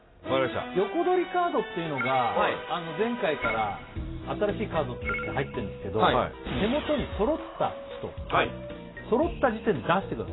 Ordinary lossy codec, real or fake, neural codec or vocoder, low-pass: AAC, 16 kbps; real; none; 7.2 kHz